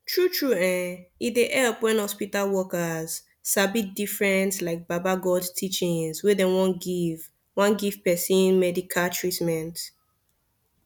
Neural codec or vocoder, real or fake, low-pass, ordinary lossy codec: none; real; 19.8 kHz; none